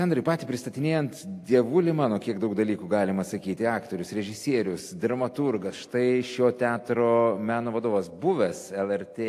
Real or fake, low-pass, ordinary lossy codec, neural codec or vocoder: real; 14.4 kHz; AAC, 64 kbps; none